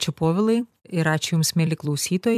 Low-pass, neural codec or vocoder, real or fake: 14.4 kHz; vocoder, 44.1 kHz, 128 mel bands every 256 samples, BigVGAN v2; fake